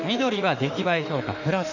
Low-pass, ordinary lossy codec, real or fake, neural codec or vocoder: 7.2 kHz; none; fake; autoencoder, 48 kHz, 32 numbers a frame, DAC-VAE, trained on Japanese speech